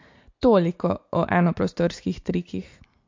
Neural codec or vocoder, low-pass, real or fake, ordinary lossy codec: none; 7.2 kHz; real; MP3, 48 kbps